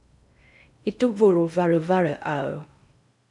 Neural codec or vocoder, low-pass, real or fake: codec, 16 kHz in and 24 kHz out, 0.6 kbps, FocalCodec, streaming, 2048 codes; 10.8 kHz; fake